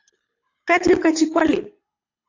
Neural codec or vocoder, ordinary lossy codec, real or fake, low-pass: codec, 24 kHz, 6 kbps, HILCodec; AAC, 48 kbps; fake; 7.2 kHz